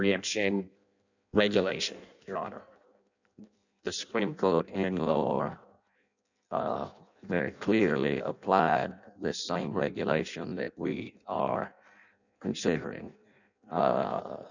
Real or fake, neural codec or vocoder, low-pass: fake; codec, 16 kHz in and 24 kHz out, 0.6 kbps, FireRedTTS-2 codec; 7.2 kHz